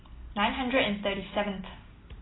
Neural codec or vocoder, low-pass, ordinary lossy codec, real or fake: none; 7.2 kHz; AAC, 16 kbps; real